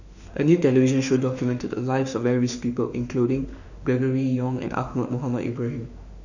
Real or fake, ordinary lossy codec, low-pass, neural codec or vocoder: fake; none; 7.2 kHz; autoencoder, 48 kHz, 32 numbers a frame, DAC-VAE, trained on Japanese speech